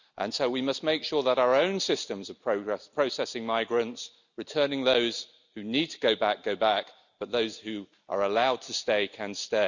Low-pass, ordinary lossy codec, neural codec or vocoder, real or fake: 7.2 kHz; none; none; real